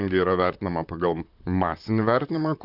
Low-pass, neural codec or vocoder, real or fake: 5.4 kHz; vocoder, 44.1 kHz, 128 mel bands, Pupu-Vocoder; fake